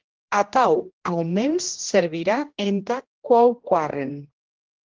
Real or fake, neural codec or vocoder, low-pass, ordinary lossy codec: fake; codec, 16 kHz, 1 kbps, X-Codec, HuBERT features, trained on general audio; 7.2 kHz; Opus, 16 kbps